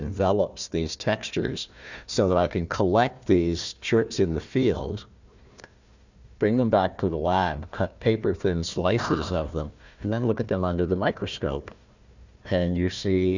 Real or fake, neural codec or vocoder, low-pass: fake; codec, 16 kHz, 1 kbps, FunCodec, trained on Chinese and English, 50 frames a second; 7.2 kHz